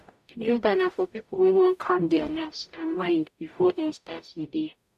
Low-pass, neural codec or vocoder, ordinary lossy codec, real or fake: 14.4 kHz; codec, 44.1 kHz, 0.9 kbps, DAC; none; fake